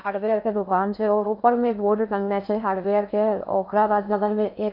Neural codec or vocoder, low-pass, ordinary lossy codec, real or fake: codec, 16 kHz in and 24 kHz out, 0.6 kbps, FocalCodec, streaming, 2048 codes; 5.4 kHz; none; fake